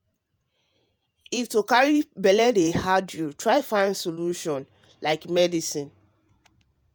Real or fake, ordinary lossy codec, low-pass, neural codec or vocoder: fake; none; none; vocoder, 48 kHz, 128 mel bands, Vocos